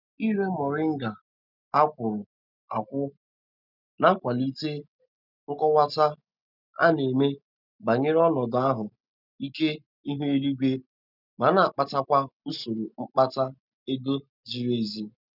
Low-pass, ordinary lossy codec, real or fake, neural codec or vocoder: 5.4 kHz; none; real; none